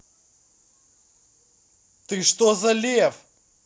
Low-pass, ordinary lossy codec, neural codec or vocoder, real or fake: none; none; none; real